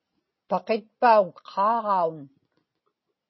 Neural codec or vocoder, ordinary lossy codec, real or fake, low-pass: none; MP3, 24 kbps; real; 7.2 kHz